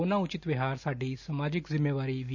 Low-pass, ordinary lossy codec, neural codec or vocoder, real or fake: 7.2 kHz; none; none; real